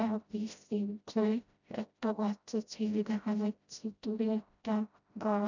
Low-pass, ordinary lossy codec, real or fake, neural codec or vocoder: 7.2 kHz; none; fake; codec, 16 kHz, 0.5 kbps, FreqCodec, smaller model